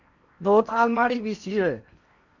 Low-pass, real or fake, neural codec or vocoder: 7.2 kHz; fake; codec, 16 kHz in and 24 kHz out, 0.8 kbps, FocalCodec, streaming, 65536 codes